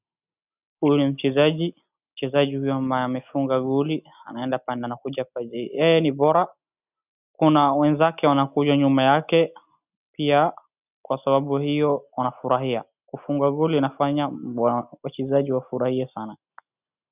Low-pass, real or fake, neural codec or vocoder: 3.6 kHz; real; none